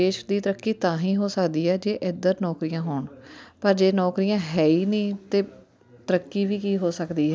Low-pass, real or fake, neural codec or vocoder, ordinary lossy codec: none; real; none; none